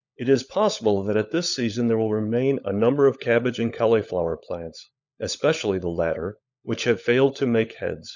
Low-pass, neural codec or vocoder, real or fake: 7.2 kHz; codec, 16 kHz, 16 kbps, FunCodec, trained on LibriTTS, 50 frames a second; fake